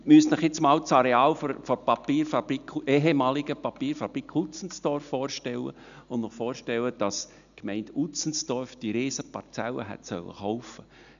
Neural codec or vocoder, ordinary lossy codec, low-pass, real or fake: none; none; 7.2 kHz; real